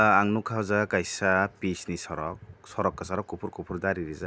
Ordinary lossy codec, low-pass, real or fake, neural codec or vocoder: none; none; real; none